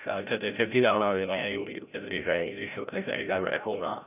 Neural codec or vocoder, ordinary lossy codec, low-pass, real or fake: codec, 16 kHz, 0.5 kbps, FreqCodec, larger model; none; 3.6 kHz; fake